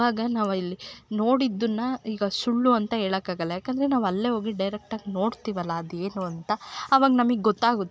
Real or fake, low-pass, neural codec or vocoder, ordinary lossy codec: real; none; none; none